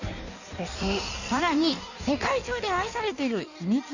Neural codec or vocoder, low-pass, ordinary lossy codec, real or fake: codec, 16 kHz in and 24 kHz out, 1.1 kbps, FireRedTTS-2 codec; 7.2 kHz; none; fake